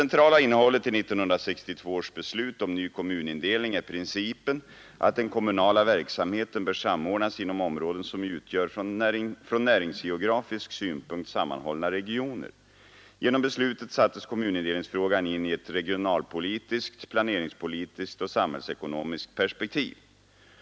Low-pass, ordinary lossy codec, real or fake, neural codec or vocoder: none; none; real; none